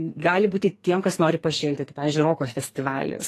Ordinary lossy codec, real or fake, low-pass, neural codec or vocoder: AAC, 48 kbps; fake; 14.4 kHz; codec, 44.1 kHz, 2.6 kbps, SNAC